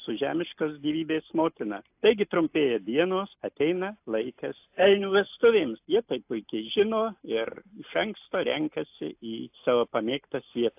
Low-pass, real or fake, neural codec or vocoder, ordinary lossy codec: 3.6 kHz; real; none; AAC, 32 kbps